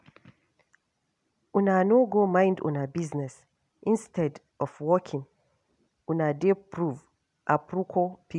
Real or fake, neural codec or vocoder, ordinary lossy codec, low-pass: real; none; none; 10.8 kHz